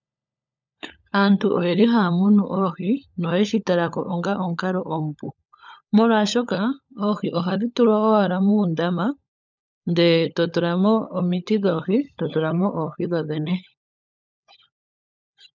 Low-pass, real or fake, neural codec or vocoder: 7.2 kHz; fake; codec, 16 kHz, 16 kbps, FunCodec, trained on LibriTTS, 50 frames a second